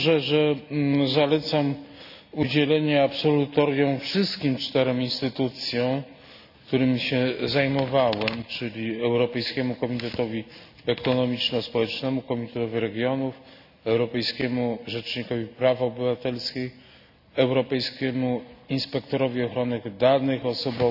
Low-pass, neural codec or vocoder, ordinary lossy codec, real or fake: 5.4 kHz; none; none; real